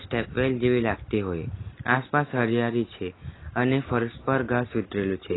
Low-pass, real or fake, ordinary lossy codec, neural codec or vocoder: 7.2 kHz; real; AAC, 16 kbps; none